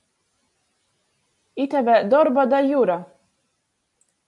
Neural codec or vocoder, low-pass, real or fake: none; 10.8 kHz; real